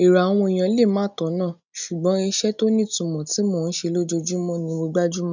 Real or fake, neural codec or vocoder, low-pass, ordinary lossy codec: real; none; 7.2 kHz; none